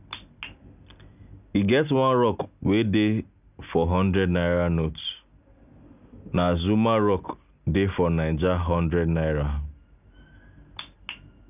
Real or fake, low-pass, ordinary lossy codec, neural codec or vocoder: real; 3.6 kHz; none; none